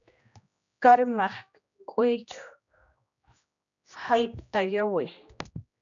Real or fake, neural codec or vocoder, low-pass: fake; codec, 16 kHz, 1 kbps, X-Codec, HuBERT features, trained on general audio; 7.2 kHz